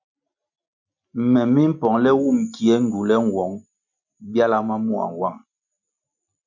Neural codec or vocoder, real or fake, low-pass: none; real; 7.2 kHz